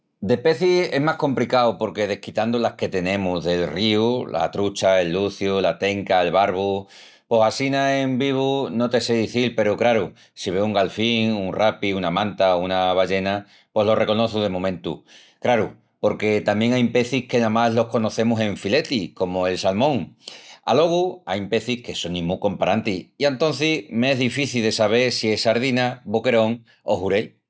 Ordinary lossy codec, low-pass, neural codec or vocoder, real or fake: none; none; none; real